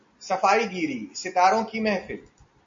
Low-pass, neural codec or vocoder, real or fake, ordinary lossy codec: 7.2 kHz; none; real; MP3, 48 kbps